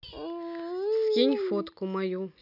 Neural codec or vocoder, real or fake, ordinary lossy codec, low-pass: none; real; none; 5.4 kHz